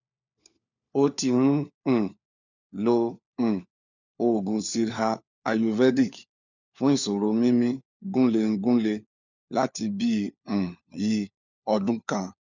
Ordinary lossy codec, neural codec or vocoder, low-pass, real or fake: none; codec, 16 kHz, 4 kbps, FunCodec, trained on LibriTTS, 50 frames a second; 7.2 kHz; fake